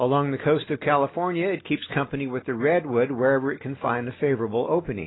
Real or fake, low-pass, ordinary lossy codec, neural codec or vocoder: real; 7.2 kHz; AAC, 16 kbps; none